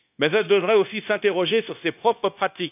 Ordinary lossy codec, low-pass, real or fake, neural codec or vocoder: none; 3.6 kHz; fake; codec, 16 kHz, 0.9 kbps, LongCat-Audio-Codec